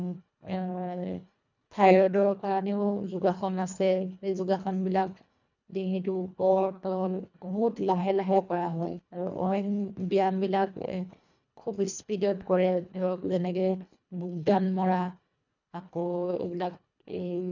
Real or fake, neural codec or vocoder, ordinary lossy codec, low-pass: fake; codec, 24 kHz, 1.5 kbps, HILCodec; none; 7.2 kHz